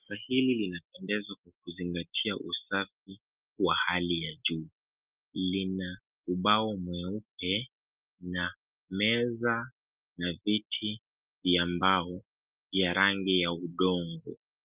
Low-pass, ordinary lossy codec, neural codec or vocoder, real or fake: 3.6 kHz; Opus, 24 kbps; none; real